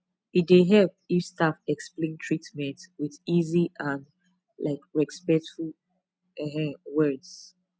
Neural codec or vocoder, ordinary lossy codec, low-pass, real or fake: none; none; none; real